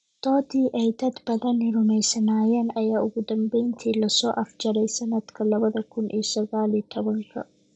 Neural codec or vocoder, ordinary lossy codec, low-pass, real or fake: none; none; 9.9 kHz; real